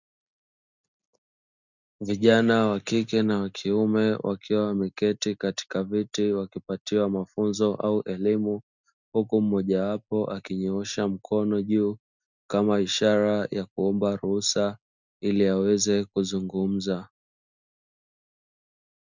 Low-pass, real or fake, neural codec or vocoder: 7.2 kHz; real; none